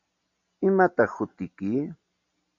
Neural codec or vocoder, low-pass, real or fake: none; 7.2 kHz; real